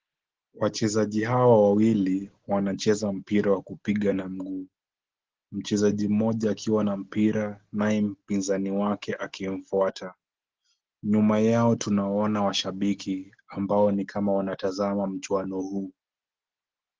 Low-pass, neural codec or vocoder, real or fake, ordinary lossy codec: 7.2 kHz; none; real; Opus, 16 kbps